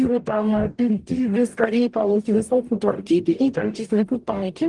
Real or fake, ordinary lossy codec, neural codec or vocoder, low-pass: fake; Opus, 24 kbps; codec, 44.1 kHz, 0.9 kbps, DAC; 10.8 kHz